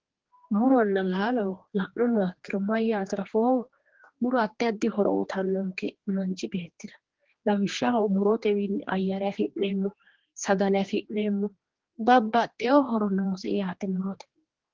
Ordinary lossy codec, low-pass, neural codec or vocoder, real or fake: Opus, 16 kbps; 7.2 kHz; codec, 16 kHz, 2 kbps, X-Codec, HuBERT features, trained on general audio; fake